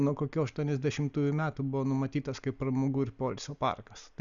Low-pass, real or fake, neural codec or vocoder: 7.2 kHz; real; none